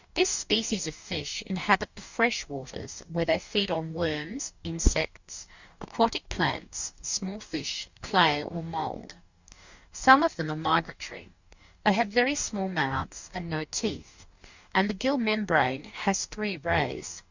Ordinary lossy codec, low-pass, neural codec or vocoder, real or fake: Opus, 64 kbps; 7.2 kHz; codec, 44.1 kHz, 2.6 kbps, DAC; fake